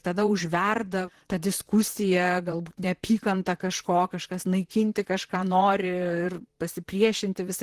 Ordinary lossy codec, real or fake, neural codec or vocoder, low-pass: Opus, 16 kbps; fake; vocoder, 44.1 kHz, 128 mel bands, Pupu-Vocoder; 14.4 kHz